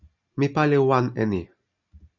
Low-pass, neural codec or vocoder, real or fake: 7.2 kHz; none; real